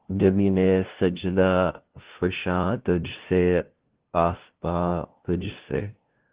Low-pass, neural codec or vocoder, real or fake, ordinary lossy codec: 3.6 kHz; codec, 16 kHz, 0.5 kbps, FunCodec, trained on LibriTTS, 25 frames a second; fake; Opus, 16 kbps